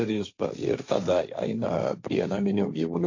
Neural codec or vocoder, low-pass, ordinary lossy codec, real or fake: codec, 16 kHz, 1.1 kbps, Voila-Tokenizer; 7.2 kHz; AAC, 48 kbps; fake